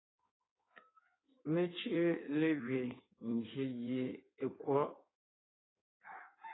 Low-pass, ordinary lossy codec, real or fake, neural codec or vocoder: 7.2 kHz; AAC, 16 kbps; fake; codec, 16 kHz in and 24 kHz out, 1.1 kbps, FireRedTTS-2 codec